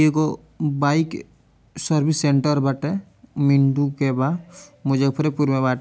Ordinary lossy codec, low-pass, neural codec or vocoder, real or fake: none; none; none; real